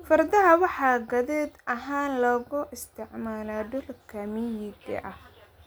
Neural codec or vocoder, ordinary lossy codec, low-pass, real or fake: none; none; none; real